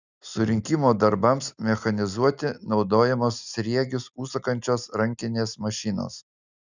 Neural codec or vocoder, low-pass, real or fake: none; 7.2 kHz; real